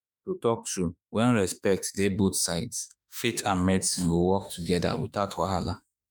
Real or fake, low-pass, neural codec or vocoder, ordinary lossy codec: fake; none; autoencoder, 48 kHz, 32 numbers a frame, DAC-VAE, trained on Japanese speech; none